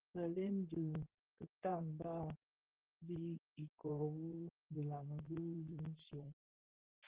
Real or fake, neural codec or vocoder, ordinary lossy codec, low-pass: fake; vocoder, 44.1 kHz, 128 mel bands, Pupu-Vocoder; Opus, 16 kbps; 3.6 kHz